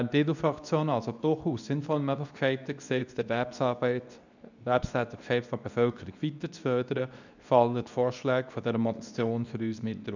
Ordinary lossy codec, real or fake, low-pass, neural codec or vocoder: none; fake; 7.2 kHz; codec, 24 kHz, 0.9 kbps, WavTokenizer, medium speech release version 2